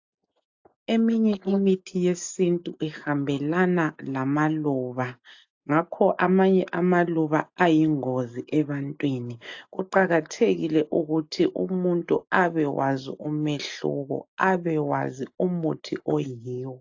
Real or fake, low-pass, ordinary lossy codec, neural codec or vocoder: real; 7.2 kHz; AAC, 48 kbps; none